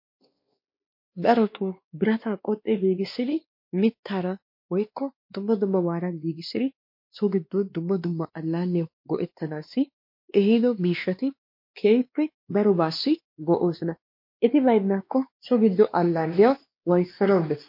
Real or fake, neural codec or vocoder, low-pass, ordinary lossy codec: fake; codec, 16 kHz, 2 kbps, X-Codec, WavLM features, trained on Multilingual LibriSpeech; 5.4 kHz; MP3, 32 kbps